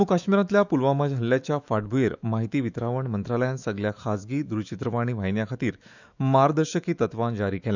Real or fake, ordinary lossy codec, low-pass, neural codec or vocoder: fake; none; 7.2 kHz; autoencoder, 48 kHz, 128 numbers a frame, DAC-VAE, trained on Japanese speech